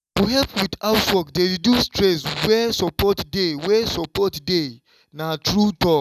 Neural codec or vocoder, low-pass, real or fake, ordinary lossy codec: none; 14.4 kHz; real; none